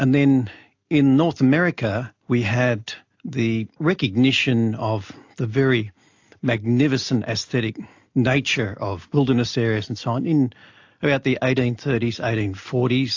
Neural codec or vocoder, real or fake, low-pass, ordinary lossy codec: none; real; 7.2 kHz; AAC, 48 kbps